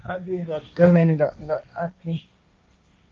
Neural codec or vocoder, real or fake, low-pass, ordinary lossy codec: codec, 16 kHz, 1.1 kbps, Voila-Tokenizer; fake; 7.2 kHz; Opus, 24 kbps